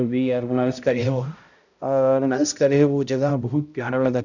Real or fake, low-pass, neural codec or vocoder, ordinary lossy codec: fake; 7.2 kHz; codec, 16 kHz, 0.5 kbps, X-Codec, HuBERT features, trained on balanced general audio; none